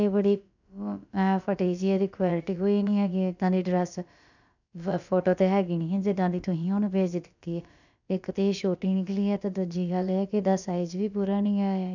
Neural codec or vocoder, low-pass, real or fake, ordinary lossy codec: codec, 16 kHz, about 1 kbps, DyCAST, with the encoder's durations; 7.2 kHz; fake; none